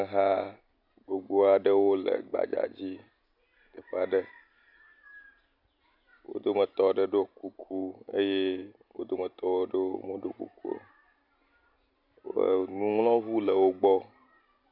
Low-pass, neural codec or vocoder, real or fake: 5.4 kHz; none; real